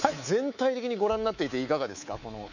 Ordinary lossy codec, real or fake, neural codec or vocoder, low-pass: none; fake; codec, 24 kHz, 3.1 kbps, DualCodec; 7.2 kHz